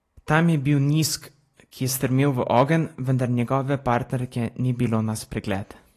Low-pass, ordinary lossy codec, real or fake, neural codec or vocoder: 14.4 kHz; AAC, 48 kbps; real; none